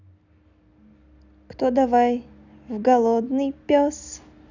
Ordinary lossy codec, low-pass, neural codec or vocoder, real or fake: none; 7.2 kHz; none; real